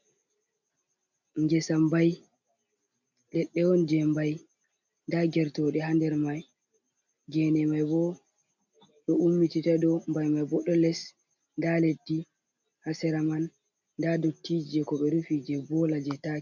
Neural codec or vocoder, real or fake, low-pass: none; real; 7.2 kHz